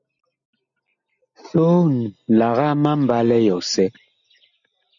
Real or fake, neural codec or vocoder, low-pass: real; none; 7.2 kHz